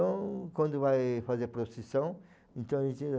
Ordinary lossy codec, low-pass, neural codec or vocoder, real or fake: none; none; none; real